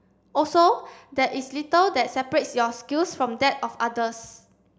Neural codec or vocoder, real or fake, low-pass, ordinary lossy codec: none; real; none; none